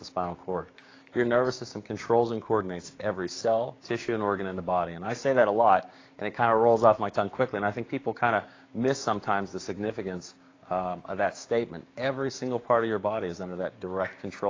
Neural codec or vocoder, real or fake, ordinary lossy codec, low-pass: codec, 16 kHz, 6 kbps, DAC; fake; AAC, 32 kbps; 7.2 kHz